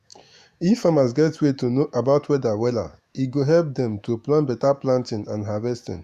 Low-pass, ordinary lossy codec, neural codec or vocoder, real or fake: 14.4 kHz; Opus, 64 kbps; autoencoder, 48 kHz, 128 numbers a frame, DAC-VAE, trained on Japanese speech; fake